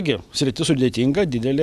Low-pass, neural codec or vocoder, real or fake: 14.4 kHz; none; real